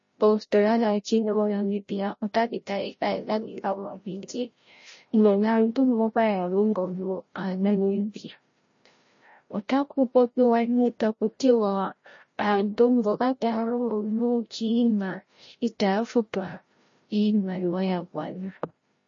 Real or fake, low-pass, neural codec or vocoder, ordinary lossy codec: fake; 7.2 kHz; codec, 16 kHz, 0.5 kbps, FreqCodec, larger model; MP3, 32 kbps